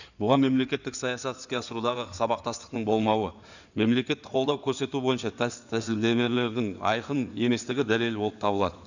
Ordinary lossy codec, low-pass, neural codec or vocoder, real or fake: none; 7.2 kHz; codec, 16 kHz in and 24 kHz out, 2.2 kbps, FireRedTTS-2 codec; fake